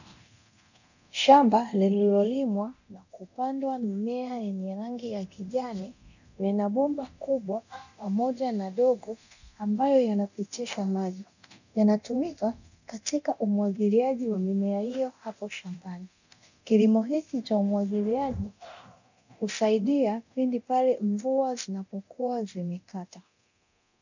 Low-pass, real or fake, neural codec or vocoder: 7.2 kHz; fake; codec, 24 kHz, 0.9 kbps, DualCodec